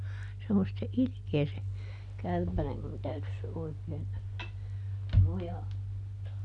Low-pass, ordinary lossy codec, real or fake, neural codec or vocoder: 10.8 kHz; none; real; none